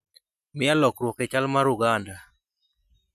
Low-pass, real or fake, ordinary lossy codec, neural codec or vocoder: 14.4 kHz; real; none; none